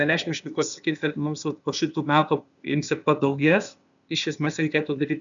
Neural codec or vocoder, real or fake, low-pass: codec, 16 kHz, 0.8 kbps, ZipCodec; fake; 7.2 kHz